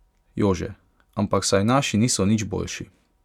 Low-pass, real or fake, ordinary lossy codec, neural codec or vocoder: 19.8 kHz; real; none; none